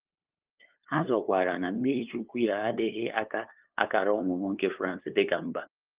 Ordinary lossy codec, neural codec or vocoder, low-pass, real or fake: Opus, 32 kbps; codec, 16 kHz, 2 kbps, FunCodec, trained on LibriTTS, 25 frames a second; 3.6 kHz; fake